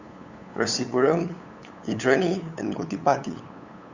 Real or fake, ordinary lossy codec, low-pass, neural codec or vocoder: fake; Opus, 64 kbps; 7.2 kHz; codec, 16 kHz, 8 kbps, FunCodec, trained on LibriTTS, 25 frames a second